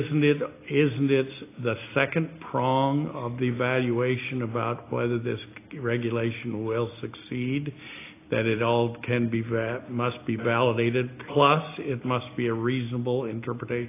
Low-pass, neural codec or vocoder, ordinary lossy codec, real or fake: 3.6 kHz; none; AAC, 24 kbps; real